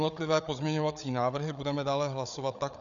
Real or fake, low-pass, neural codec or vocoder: fake; 7.2 kHz; codec, 16 kHz, 8 kbps, FreqCodec, larger model